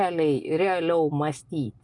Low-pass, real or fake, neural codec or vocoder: 10.8 kHz; real; none